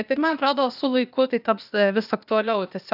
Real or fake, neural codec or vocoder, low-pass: fake; codec, 16 kHz, 0.8 kbps, ZipCodec; 5.4 kHz